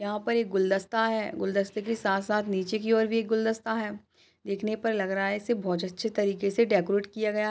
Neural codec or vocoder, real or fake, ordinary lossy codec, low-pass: none; real; none; none